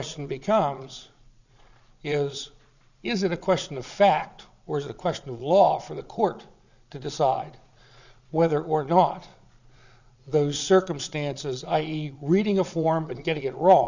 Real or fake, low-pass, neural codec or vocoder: fake; 7.2 kHz; vocoder, 22.05 kHz, 80 mel bands, Vocos